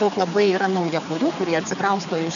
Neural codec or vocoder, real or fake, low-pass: codec, 16 kHz, 4 kbps, X-Codec, HuBERT features, trained on general audio; fake; 7.2 kHz